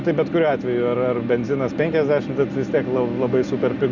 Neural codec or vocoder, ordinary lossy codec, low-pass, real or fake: none; Opus, 64 kbps; 7.2 kHz; real